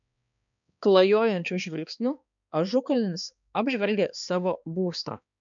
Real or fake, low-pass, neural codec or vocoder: fake; 7.2 kHz; codec, 16 kHz, 2 kbps, X-Codec, HuBERT features, trained on balanced general audio